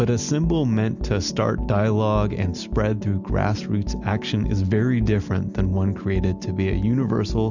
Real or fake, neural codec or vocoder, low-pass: real; none; 7.2 kHz